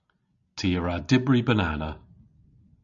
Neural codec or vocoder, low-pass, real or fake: none; 7.2 kHz; real